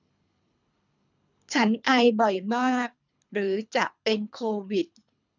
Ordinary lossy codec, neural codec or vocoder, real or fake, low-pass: none; codec, 24 kHz, 3 kbps, HILCodec; fake; 7.2 kHz